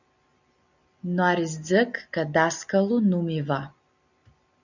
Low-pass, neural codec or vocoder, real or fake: 7.2 kHz; none; real